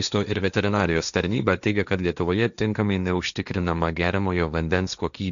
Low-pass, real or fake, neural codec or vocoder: 7.2 kHz; fake; codec, 16 kHz, 1.1 kbps, Voila-Tokenizer